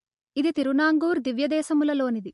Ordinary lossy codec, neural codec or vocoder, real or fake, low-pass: MP3, 48 kbps; none; real; 14.4 kHz